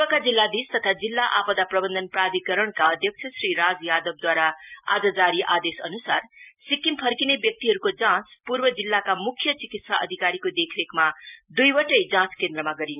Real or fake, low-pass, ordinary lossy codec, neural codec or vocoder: real; 3.6 kHz; none; none